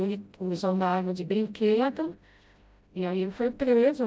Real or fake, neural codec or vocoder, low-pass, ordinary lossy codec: fake; codec, 16 kHz, 0.5 kbps, FreqCodec, smaller model; none; none